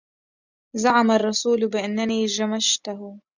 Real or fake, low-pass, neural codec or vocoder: real; 7.2 kHz; none